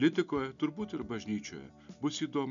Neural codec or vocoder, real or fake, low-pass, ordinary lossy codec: none; real; 7.2 kHz; MP3, 96 kbps